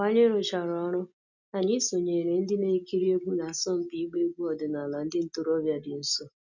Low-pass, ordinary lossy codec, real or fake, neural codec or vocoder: 7.2 kHz; none; real; none